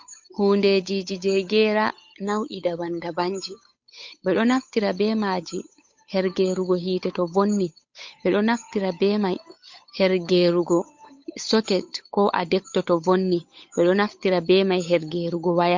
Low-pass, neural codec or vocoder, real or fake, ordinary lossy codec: 7.2 kHz; codec, 16 kHz, 8 kbps, FunCodec, trained on Chinese and English, 25 frames a second; fake; MP3, 48 kbps